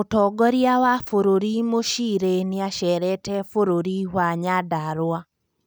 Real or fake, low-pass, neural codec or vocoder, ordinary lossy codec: real; none; none; none